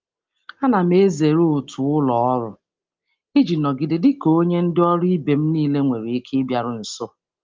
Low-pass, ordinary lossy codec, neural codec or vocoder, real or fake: 7.2 kHz; Opus, 32 kbps; none; real